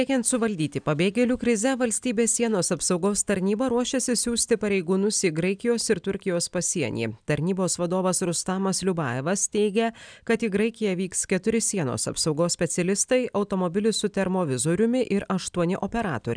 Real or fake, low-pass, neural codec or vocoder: real; 9.9 kHz; none